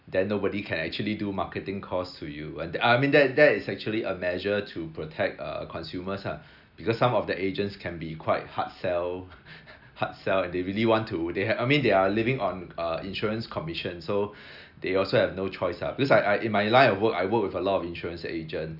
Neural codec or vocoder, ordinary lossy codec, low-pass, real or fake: none; none; 5.4 kHz; real